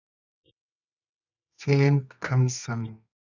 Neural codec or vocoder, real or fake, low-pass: codec, 24 kHz, 0.9 kbps, WavTokenizer, medium music audio release; fake; 7.2 kHz